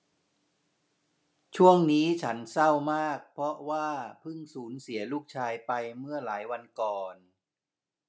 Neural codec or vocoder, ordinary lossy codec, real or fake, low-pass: none; none; real; none